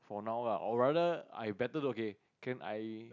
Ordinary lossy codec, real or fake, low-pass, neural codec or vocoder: none; real; 7.2 kHz; none